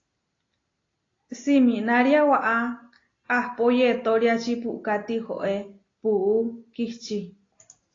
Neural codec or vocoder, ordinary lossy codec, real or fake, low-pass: none; AAC, 32 kbps; real; 7.2 kHz